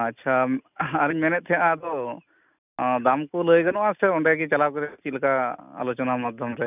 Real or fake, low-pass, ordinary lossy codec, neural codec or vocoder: real; 3.6 kHz; none; none